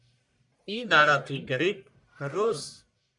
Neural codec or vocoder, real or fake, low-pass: codec, 44.1 kHz, 1.7 kbps, Pupu-Codec; fake; 10.8 kHz